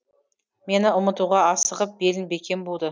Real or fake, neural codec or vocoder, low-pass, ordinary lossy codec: real; none; none; none